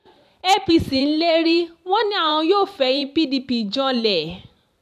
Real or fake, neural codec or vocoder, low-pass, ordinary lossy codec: fake; vocoder, 44.1 kHz, 128 mel bands every 512 samples, BigVGAN v2; 14.4 kHz; none